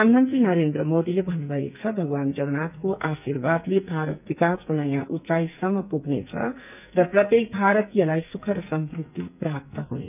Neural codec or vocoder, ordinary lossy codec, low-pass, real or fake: codec, 44.1 kHz, 2.6 kbps, SNAC; none; 3.6 kHz; fake